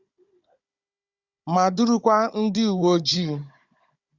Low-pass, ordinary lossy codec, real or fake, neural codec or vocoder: 7.2 kHz; Opus, 64 kbps; fake; codec, 16 kHz, 16 kbps, FunCodec, trained on Chinese and English, 50 frames a second